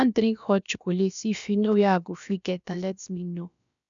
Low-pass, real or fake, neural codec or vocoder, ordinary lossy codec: 7.2 kHz; fake; codec, 16 kHz, about 1 kbps, DyCAST, with the encoder's durations; none